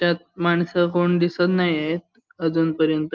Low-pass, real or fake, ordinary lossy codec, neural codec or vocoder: 7.2 kHz; real; Opus, 24 kbps; none